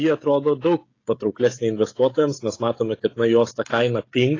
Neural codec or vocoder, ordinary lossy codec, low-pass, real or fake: codec, 16 kHz, 16 kbps, FreqCodec, smaller model; AAC, 32 kbps; 7.2 kHz; fake